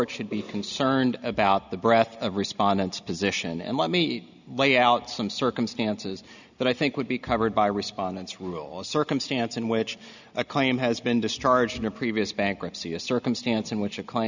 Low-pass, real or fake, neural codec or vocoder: 7.2 kHz; real; none